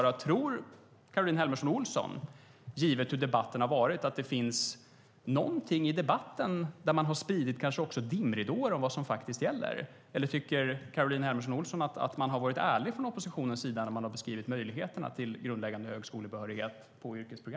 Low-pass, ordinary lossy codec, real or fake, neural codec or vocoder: none; none; real; none